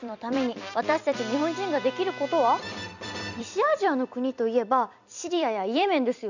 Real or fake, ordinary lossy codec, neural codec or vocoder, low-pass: fake; none; vocoder, 44.1 kHz, 128 mel bands every 256 samples, BigVGAN v2; 7.2 kHz